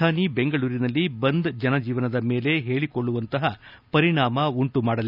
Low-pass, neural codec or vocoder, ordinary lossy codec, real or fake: 5.4 kHz; none; none; real